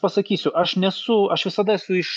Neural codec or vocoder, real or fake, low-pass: none; real; 10.8 kHz